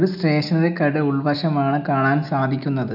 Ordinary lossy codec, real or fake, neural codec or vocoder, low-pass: none; real; none; 5.4 kHz